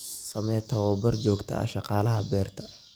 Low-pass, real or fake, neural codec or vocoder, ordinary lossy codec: none; fake; codec, 44.1 kHz, 7.8 kbps, DAC; none